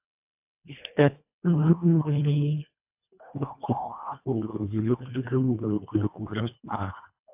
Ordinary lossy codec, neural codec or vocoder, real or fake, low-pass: AAC, 32 kbps; codec, 24 kHz, 1.5 kbps, HILCodec; fake; 3.6 kHz